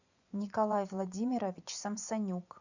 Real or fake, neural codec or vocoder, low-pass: fake; vocoder, 24 kHz, 100 mel bands, Vocos; 7.2 kHz